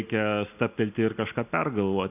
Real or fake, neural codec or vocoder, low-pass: real; none; 3.6 kHz